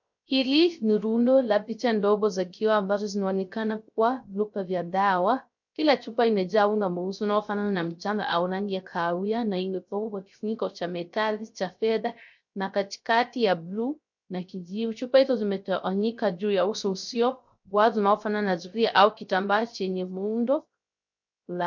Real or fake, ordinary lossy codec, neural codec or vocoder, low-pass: fake; MP3, 48 kbps; codec, 16 kHz, 0.3 kbps, FocalCodec; 7.2 kHz